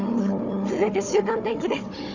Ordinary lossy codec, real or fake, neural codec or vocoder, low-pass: none; fake; codec, 16 kHz, 4 kbps, FunCodec, trained on Chinese and English, 50 frames a second; 7.2 kHz